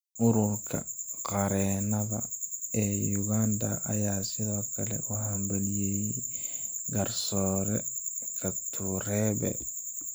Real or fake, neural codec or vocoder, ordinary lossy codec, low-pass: real; none; none; none